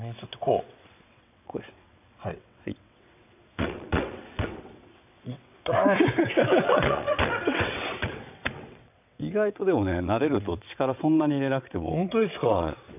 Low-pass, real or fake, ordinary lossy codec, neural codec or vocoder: 3.6 kHz; fake; none; vocoder, 22.05 kHz, 80 mel bands, WaveNeXt